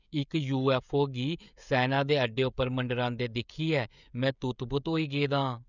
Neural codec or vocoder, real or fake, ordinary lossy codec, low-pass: codec, 16 kHz, 16 kbps, FreqCodec, smaller model; fake; none; 7.2 kHz